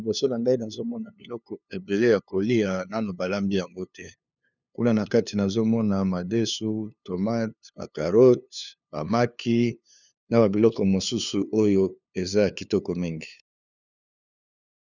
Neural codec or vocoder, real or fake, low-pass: codec, 16 kHz, 2 kbps, FunCodec, trained on LibriTTS, 25 frames a second; fake; 7.2 kHz